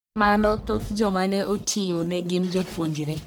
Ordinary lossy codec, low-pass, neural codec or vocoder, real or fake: none; none; codec, 44.1 kHz, 1.7 kbps, Pupu-Codec; fake